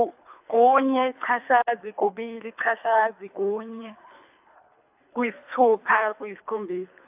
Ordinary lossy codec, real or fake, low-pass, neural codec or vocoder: AAC, 32 kbps; fake; 3.6 kHz; codec, 24 kHz, 3 kbps, HILCodec